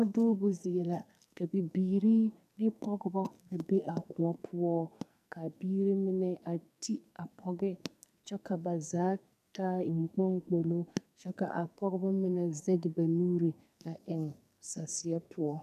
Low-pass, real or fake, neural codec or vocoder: 14.4 kHz; fake; codec, 44.1 kHz, 2.6 kbps, SNAC